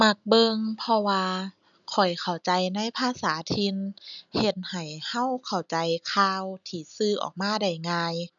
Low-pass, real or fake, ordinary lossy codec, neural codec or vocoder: 7.2 kHz; real; none; none